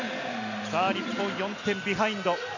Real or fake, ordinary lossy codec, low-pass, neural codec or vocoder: real; none; 7.2 kHz; none